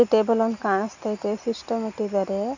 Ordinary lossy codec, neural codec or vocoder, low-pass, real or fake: none; none; 7.2 kHz; real